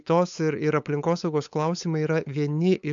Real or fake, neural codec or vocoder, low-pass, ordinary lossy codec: fake; codec, 16 kHz, 8 kbps, FunCodec, trained on LibriTTS, 25 frames a second; 7.2 kHz; AAC, 64 kbps